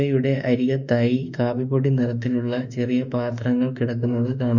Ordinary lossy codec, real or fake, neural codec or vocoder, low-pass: none; fake; autoencoder, 48 kHz, 32 numbers a frame, DAC-VAE, trained on Japanese speech; 7.2 kHz